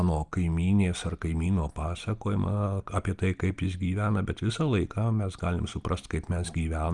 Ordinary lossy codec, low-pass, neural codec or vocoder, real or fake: Opus, 32 kbps; 10.8 kHz; none; real